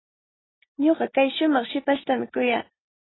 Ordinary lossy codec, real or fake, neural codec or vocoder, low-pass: AAC, 16 kbps; real; none; 7.2 kHz